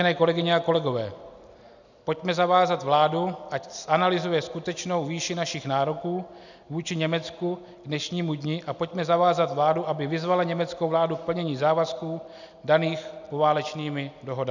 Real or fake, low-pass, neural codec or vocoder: real; 7.2 kHz; none